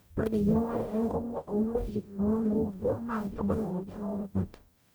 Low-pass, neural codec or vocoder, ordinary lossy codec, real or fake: none; codec, 44.1 kHz, 0.9 kbps, DAC; none; fake